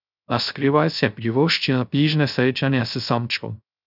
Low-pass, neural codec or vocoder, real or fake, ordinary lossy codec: 5.4 kHz; codec, 16 kHz, 0.3 kbps, FocalCodec; fake; none